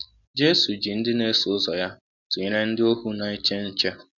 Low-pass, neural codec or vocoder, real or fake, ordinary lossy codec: 7.2 kHz; none; real; none